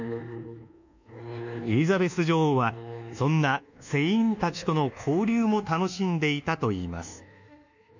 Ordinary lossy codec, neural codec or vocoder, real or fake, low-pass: none; codec, 24 kHz, 1.2 kbps, DualCodec; fake; 7.2 kHz